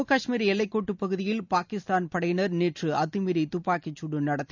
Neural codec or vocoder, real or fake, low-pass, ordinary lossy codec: none; real; 7.2 kHz; none